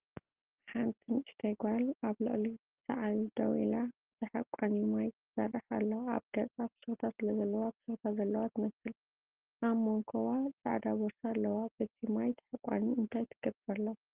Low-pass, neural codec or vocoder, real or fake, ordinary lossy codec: 3.6 kHz; none; real; Opus, 16 kbps